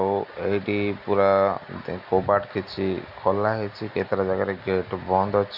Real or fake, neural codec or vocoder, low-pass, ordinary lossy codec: real; none; 5.4 kHz; none